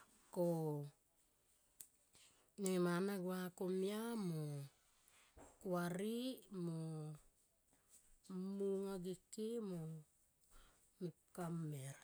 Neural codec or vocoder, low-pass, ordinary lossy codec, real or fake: none; none; none; real